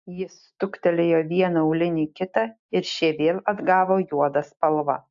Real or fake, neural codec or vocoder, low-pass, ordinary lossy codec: real; none; 7.2 kHz; AAC, 64 kbps